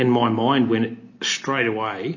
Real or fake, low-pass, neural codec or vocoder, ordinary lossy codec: real; 7.2 kHz; none; MP3, 32 kbps